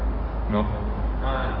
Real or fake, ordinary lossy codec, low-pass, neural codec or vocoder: fake; Opus, 24 kbps; 5.4 kHz; codec, 44.1 kHz, 7.8 kbps, DAC